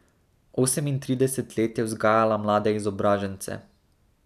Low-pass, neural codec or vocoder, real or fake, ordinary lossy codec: 14.4 kHz; none; real; none